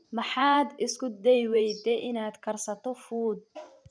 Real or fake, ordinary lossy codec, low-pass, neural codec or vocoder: fake; none; 9.9 kHz; vocoder, 44.1 kHz, 128 mel bands every 512 samples, BigVGAN v2